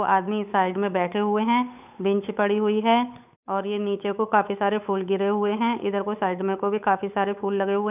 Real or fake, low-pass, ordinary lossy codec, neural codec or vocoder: fake; 3.6 kHz; none; codec, 16 kHz, 8 kbps, FunCodec, trained on Chinese and English, 25 frames a second